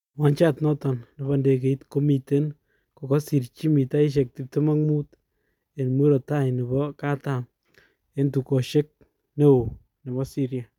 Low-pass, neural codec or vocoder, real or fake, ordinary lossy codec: 19.8 kHz; none; real; none